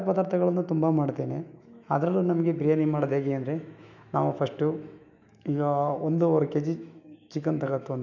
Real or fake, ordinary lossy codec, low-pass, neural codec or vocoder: fake; none; 7.2 kHz; autoencoder, 48 kHz, 128 numbers a frame, DAC-VAE, trained on Japanese speech